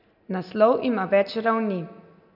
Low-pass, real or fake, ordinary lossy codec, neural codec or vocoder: 5.4 kHz; real; none; none